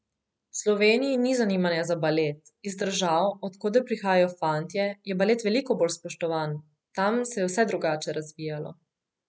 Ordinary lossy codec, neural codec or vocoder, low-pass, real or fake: none; none; none; real